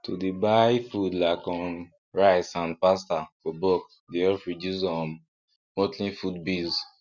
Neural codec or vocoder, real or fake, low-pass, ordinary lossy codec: none; real; 7.2 kHz; none